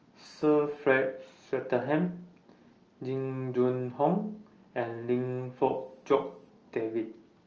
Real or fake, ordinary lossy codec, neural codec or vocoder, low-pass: real; Opus, 24 kbps; none; 7.2 kHz